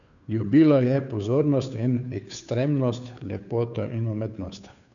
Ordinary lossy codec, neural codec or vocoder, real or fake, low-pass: none; codec, 16 kHz, 2 kbps, FunCodec, trained on Chinese and English, 25 frames a second; fake; 7.2 kHz